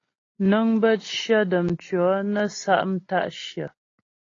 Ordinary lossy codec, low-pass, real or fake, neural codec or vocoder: AAC, 32 kbps; 7.2 kHz; real; none